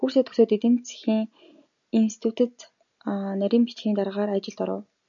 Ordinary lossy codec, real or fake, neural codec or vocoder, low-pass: MP3, 96 kbps; real; none; 7.2 kHz